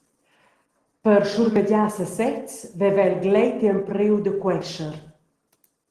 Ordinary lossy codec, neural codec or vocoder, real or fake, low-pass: Opus, 16 kbps; none; real; 14.4 kHz